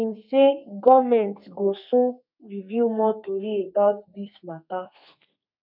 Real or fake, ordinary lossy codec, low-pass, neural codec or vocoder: fake; none; 5.4 kHz; codec, 32 kHz, 1.9 kbps, SNAC